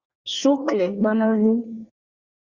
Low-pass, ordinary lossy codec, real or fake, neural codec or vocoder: 7.2 kHz; Opus, 64 kbps; fake; codec, 24 kHz, 1 kbps, SNAC